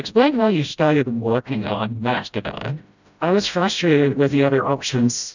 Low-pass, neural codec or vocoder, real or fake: 7.2 kHz; codec, 16 kHz, 0.5 kbps, FreqCodec, smaller model; fake